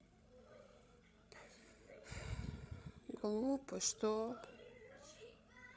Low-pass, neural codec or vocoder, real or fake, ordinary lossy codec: none; codec, 16 kHz, 16 kbps, FreqCodec, larger model; fake; none